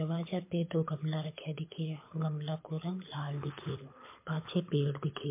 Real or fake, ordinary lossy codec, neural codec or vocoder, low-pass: fake; MP3, 24 kbps; codec, 24 kHz, 6 kbps, HILCodec; 3.6 kHz